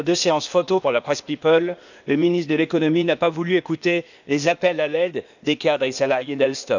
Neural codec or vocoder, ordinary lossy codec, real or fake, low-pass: codec, 16 kHz, 0.8 kbps, ZipCodec; none; fake; 7.2 kHz